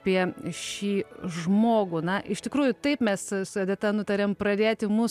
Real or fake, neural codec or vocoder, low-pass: real; none; 14.4 kHz